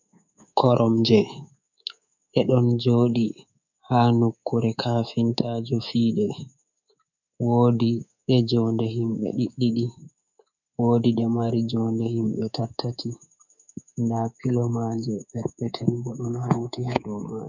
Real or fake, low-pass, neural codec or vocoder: fake; 7.2 kHz; codec, 24 kHz, 3.1 kbps, DualCodec